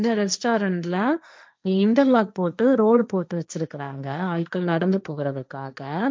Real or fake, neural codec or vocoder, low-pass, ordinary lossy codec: fake; codec, 16 kHz, 1.1 kbps, Voila-Tokenizer; none; none